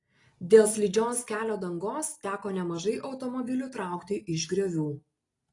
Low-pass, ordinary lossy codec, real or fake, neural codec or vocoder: 10.8 kHz; AAC, 32 kbps; real; none